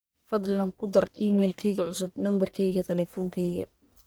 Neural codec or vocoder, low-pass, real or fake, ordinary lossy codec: codec, 44.1 kHz, 1.7 kbps, Pupu-Codec; none; fake; none